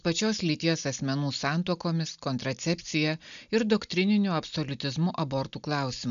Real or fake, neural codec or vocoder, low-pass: real; none; 7.2 kHz